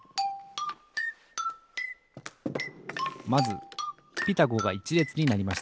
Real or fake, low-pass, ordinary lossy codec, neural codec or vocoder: real; none; none; none